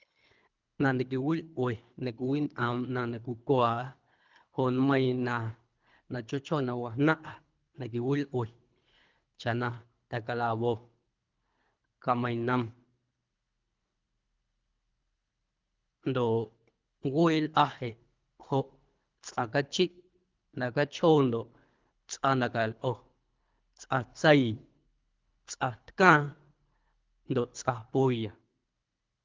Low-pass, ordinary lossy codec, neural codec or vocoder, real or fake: 7.2 kHz; Opus, 24 kbps; codec, 24 kHz, 3 kbps, HILCodec; fake